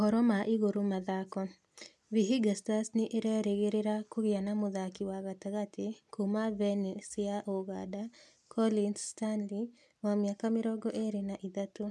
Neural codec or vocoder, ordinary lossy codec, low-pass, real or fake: none; none; none; real